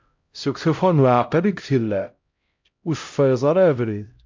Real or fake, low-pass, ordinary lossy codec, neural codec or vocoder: fake; 7.2 kHz; MP3, 48 kbps; codec, 16 kHz, 0.5 kbps, X-Codec, HuBERT features, trained on LibriSpeech